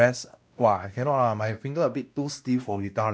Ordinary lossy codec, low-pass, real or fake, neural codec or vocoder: none; none; fake; codec, 16 kHz, 1 kbps, X-Codec, WavLM features, trained on Multilingual LibriSpeech